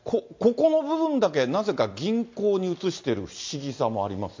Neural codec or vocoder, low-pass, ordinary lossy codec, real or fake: none; 7.2 kHz; MP3, 48 kbps; real